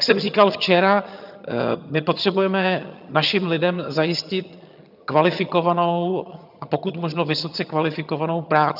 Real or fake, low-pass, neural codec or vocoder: fake; 5.4 kHz; vocoder, 22.05 kHz, 80 mel bands, HiFi-GAN